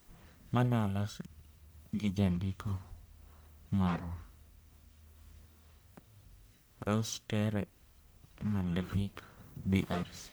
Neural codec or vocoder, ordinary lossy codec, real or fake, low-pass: codec, 44.1 kHz, 1.7 kbps, Pupu-Codec; none; fake; none